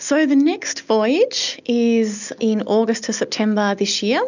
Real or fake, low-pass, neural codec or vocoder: real; 7.2 kHz; none